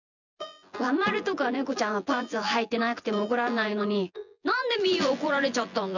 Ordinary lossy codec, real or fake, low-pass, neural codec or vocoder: none; fake; 7.2 kHz; vocoder, 24 kHz, 100 mel bands, Vocos